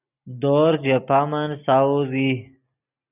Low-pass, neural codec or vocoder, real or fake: 3.6 kHz; none; real